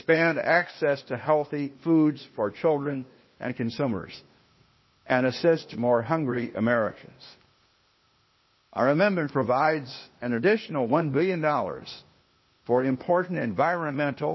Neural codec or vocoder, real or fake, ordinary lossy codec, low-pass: codec, 16 kHz, 0.8 kbps, ZipCodec; fake; MP3, 24 kbps; 7.2 kHz